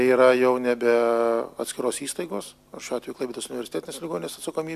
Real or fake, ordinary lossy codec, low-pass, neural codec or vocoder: real; AAC, 96 kbps; 14.4 kHz; none